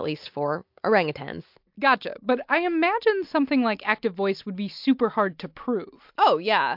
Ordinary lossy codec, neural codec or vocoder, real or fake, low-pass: MP3, 48 kbps; none; real; 5.4 kHz